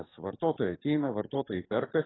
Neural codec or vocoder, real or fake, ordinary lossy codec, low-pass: codec, 44.1 kHz, 7.8 kbps, DAC; fake; AAC, 16 kbps; 7.2 kHz